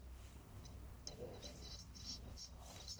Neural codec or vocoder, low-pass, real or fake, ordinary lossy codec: codec, 44.1 kHz, 1.7 kbps, Pupu-Codec; none; fake; none